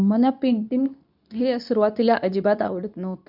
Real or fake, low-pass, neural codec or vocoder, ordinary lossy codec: fake; 5.4 kHz; codec, 24 kHz, 0.9 kbps, WavTokenizer, medium speech release version 2; none